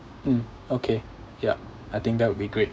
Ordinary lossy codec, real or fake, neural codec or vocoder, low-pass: none; fake; codec, 16 kHz, 6 kbps, DAC; none